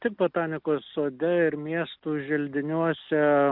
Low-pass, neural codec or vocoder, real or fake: 5.4 kHz; none; real